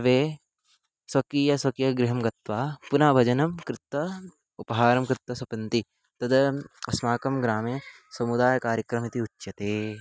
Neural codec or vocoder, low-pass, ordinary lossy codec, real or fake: none; none; none; real